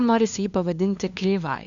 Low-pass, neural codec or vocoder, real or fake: 7.2 kHz; codec, 16 kHz, 2 kbps, FunCodec, trained on LibriTTS, 25 frames a second; fake